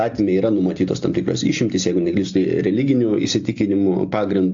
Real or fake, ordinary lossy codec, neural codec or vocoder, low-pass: real; AAC, 64 kbps; none; 7.2 kHz